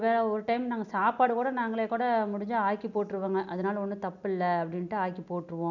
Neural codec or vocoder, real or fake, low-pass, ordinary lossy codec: none; real; 7.2 kHz; none